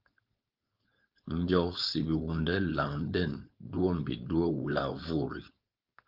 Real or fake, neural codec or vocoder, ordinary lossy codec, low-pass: fake; codec, 16 kHz, 4.8 kbps, FACodec; Opus, 32 kbps; 5.4 kHz